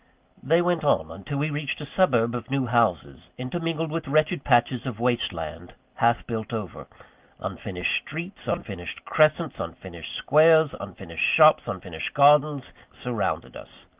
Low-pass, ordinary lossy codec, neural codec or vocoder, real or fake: 3.6 kHz; Opus, 24 kbps; none; real